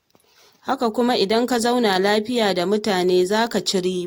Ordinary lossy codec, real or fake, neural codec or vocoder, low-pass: AAC, 48 kbps; real; none; 19.8 kHz